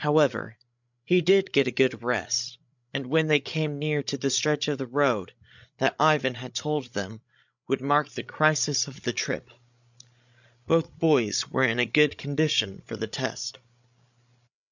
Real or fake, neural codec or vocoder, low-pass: fake; codec, 16 kHz, 16 kbps, FreqCodec, larger model; 7.2 kHz